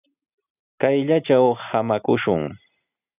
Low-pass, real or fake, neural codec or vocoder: 3.6 kHz; real; none